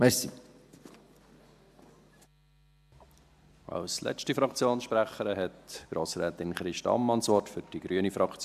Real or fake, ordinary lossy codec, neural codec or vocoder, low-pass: real; AAC, 96 kbps; none; 14.4 kHz